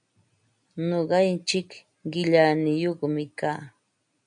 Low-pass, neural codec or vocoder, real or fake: 9.9 kHz; none; real